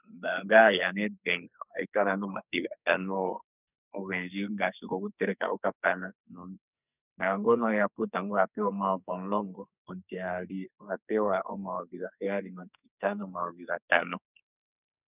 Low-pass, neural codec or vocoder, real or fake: 3.6 kHz; codec, 44.1 kHz, 2.6 kbps, SNAC; fake